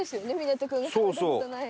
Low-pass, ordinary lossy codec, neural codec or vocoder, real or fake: none; none; none; real